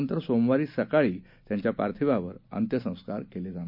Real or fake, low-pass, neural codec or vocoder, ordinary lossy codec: real; 5.4 kHz; none; none